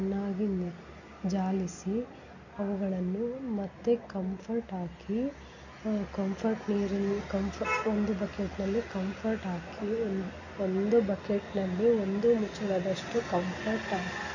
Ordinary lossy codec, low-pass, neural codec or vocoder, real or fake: none; 7.2 kHz; none; real